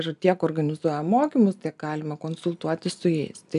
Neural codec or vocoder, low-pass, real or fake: none; 10.8 kHz; real